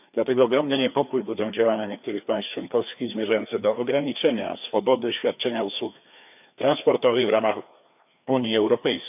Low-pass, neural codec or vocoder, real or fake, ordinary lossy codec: 3.6 kHz; codec, 16 kHz, 2 kbps, FreqCodec, larger model; fake; none